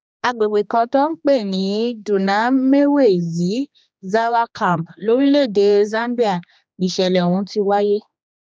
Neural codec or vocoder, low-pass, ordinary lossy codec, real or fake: codec, 16 kHz, 2 kbps, X-Codec, HuBERT features, trained on general audio; none; none; fake